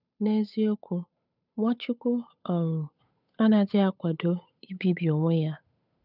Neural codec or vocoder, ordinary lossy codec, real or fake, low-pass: codec, 16 kHz, 8 kbps, FunCodec, trained on Chinese and English, 25 frames a second; none; fake; 5.4 kHz